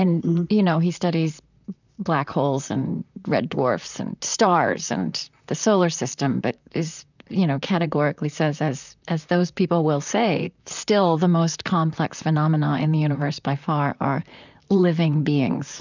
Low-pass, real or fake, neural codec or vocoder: 7.2 kHz; fake; vocoder, 44.1 kHz, 128 mel bands, Pupu-Vocoder